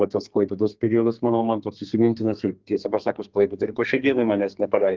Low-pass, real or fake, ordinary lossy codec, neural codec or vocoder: 7.2 kHz; fake; Opus, 32 kbps; codec, 32 kHz, 1.9 kbps, SNAC